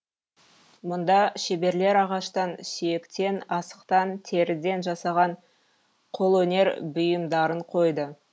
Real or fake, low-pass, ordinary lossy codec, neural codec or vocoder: real; none; none; none